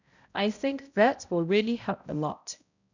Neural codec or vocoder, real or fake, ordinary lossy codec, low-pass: codec, 16 kHz, 0.5 kbps, X-Codec, HuBERT features, trained on balanced general audio; fake; none; 7.2 kHz